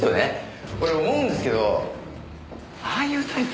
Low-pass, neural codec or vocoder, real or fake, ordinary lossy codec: none; none; real; none